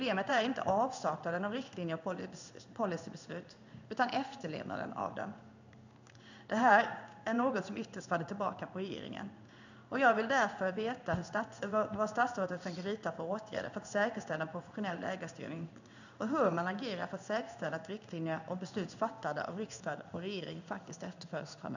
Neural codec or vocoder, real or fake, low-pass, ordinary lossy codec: codec, 16 kHz in and 24 kHz out, 1 kbps, XY-Tokenizer; fake; 7.2 kHz; none